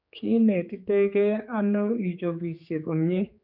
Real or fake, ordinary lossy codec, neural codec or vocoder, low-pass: fake; none; codec, 16 kHz, 4 kbps, X-Codec, HuBERT features, trained on general audio; 5.4 kHz